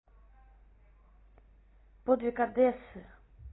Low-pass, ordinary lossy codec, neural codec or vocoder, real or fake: 7.2 kHz; AAC, 16 kbps; none; real